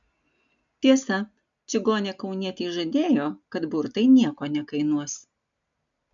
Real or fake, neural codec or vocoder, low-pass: real; none; 7.2 kHz